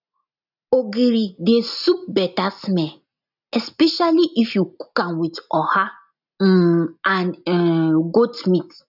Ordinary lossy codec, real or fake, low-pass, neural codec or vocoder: none; real; 5.4 kHz; none